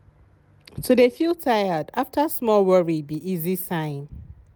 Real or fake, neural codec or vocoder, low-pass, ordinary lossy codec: real; none; none; none